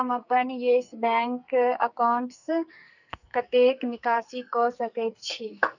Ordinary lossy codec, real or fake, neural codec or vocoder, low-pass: none; fake; codec, 44.1 kHz, 2.6 kbps, SNAC; 7.2 kHz